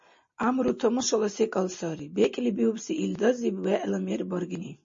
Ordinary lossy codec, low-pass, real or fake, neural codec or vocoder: MP3, 32 kbps; 7.2 kHz; real; none